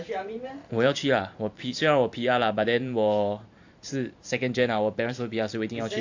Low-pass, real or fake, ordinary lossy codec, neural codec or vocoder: 7.2 kHz; fake; AAC, 48 kbps; vocoder, 44.1 kHz, 128 mel bands every 512 samples, BigVGAN v2